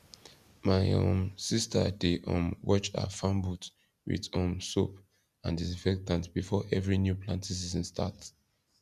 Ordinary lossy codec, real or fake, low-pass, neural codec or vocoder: none; real; 14.4 kHz; none